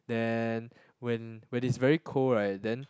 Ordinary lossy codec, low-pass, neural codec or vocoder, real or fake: none; none; none; real